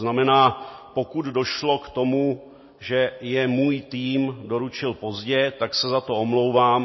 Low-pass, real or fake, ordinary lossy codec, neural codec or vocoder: 7.2 kHz; real; MP3, 24 kbps; none